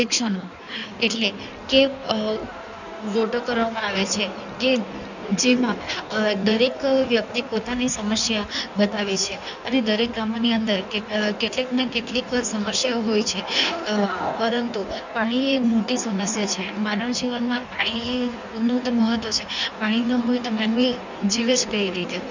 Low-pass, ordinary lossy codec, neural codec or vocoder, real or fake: 7.2 kHz; none; codec, 16 kHz in and 24 kHz out, 1.1 kbps, FireRedTTS-2 codec; fake